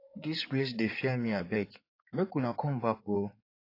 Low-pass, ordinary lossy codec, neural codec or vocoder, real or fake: 5.4 kHz; AAC, 32 kbps; codec, 16 kHz in and 24 kHz out, 2.2 kbps, FireRedTTS-2 codec; fake